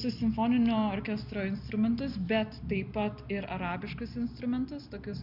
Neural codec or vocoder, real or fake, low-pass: none; real; 5.4 kHz